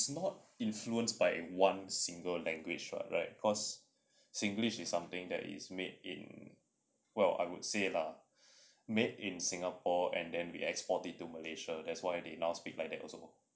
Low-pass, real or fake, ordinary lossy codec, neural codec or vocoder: none; real; none; none